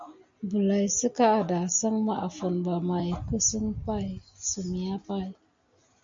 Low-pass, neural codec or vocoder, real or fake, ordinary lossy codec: 7.2 kHz; none; real; MP3, 48 kbps